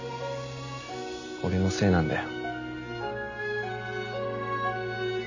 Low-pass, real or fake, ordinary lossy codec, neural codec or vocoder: 7.2 kHz; real; none; none